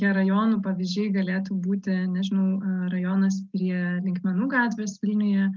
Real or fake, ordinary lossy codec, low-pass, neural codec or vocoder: real; Opus, 32 kbps; 7.2 kHz; none